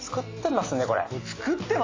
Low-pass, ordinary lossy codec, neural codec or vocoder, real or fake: 7.2 kHz; AAC, 32 kbps; none; real